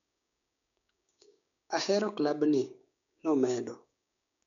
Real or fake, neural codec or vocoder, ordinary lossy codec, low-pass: fake; codec, 16 kHz, 6 kbps, DAC; none; 7.2 kHz